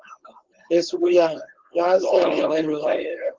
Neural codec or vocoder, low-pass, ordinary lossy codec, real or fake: codec, 16 kHz, 4.8 kbps, FACodec; 7.2 kHz; Opus, 16 kbps; fake